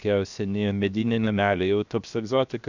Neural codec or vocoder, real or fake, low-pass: codec, 16 kHz, 0.7 kbps, FocalCodec; fake; 7.2 kHz